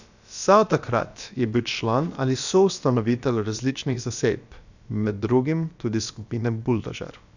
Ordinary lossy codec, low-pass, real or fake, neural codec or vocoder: none; 7.2 kHz; fake; codec, 16 kHz, about 1 kbps, DyCAST, with the encoder's durations